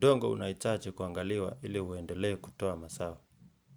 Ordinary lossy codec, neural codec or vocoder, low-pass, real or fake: none; vocoder, 44.1 kHz, 128 mel bands every 512 samples, BigVGAN v2; none; fake